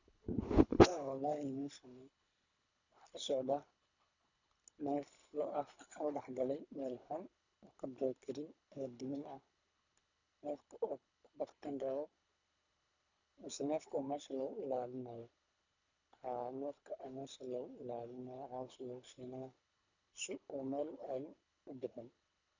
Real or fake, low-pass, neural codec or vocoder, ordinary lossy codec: fake; 7.2 kHz; codec, 24 kHz, 3 kbps, HILCodec; none